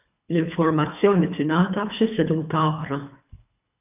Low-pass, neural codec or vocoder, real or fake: 3.6 kHz; codec, 24 kHz, 3 kbps, HILCodec; fake